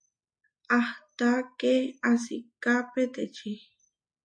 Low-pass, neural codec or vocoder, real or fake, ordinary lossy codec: 9.9 kHz; none; real; MP3, 48 kbps